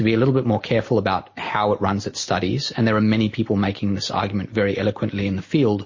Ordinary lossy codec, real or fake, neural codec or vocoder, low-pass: MP3, 32 kbps; real; none; 7.2 kHz